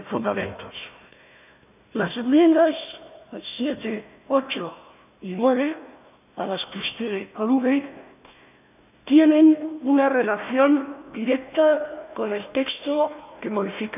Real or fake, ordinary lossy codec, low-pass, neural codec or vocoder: fake; none; 3.6 kHz; codec, 16 kHz, 1 kbps, FunCodec, trained on Chinese and English, 50 frames a second